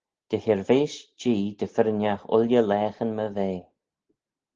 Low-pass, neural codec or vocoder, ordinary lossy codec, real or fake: 7.2 kHz; none; Opus, 16 kbps; real